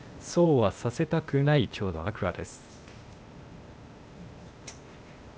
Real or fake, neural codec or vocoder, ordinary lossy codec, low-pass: fake; codec, 16 kHz, 0.8 kbps, ZipCodec; none; none